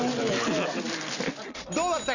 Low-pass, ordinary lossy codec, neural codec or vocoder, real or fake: 7.2 kHz; none; none; real